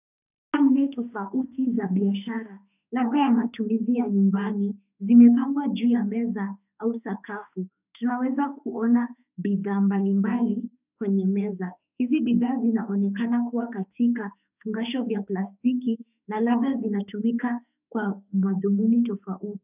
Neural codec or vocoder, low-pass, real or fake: autoencoder, 48 kHz, 32 numbers a frame, DAC-VAE, trained on Japanese speech; 3.6 kHz; fake